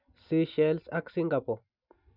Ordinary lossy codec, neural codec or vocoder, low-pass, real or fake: none; none; 5.4 kHz; real